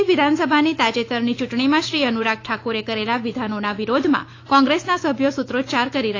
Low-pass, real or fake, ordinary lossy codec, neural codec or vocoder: 7.2 kHz; fake; AAC, 32 kbps; autoencoder, 48 kHz, 128 numbers a frame, DAC-VAE, trained on Japanese speech